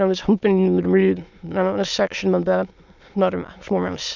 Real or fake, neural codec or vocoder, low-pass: fake; autoencoder, 22.05 kHz, a latent of 192 numbers a frame, VITS, trained on many speakers; 7.2 kHz